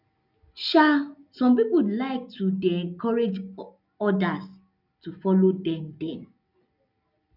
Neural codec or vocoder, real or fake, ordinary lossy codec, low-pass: none; real; none; 5.4 kHz